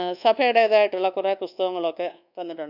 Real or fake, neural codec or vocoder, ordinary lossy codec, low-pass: fake; codec, 24 kHz, 1.2 kbps, DualCodec; none; 5.4 kHz